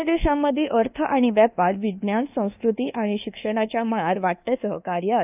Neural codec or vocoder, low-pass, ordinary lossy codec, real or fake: codec, 24 kHz, 1.2 kbps, DualCodec; 3.6 kHz; none; fake